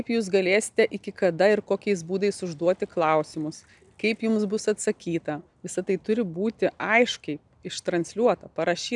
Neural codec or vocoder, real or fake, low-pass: none; real; 10.8 kHz